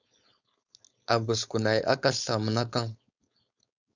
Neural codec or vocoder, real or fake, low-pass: codec, 16 kHz, 4.8 kbps, FACodec; fake; 7.2 kHz